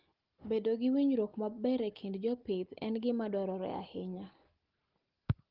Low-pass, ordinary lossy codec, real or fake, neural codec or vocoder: 5.4 kHz; Opus, 16 kbps; real; none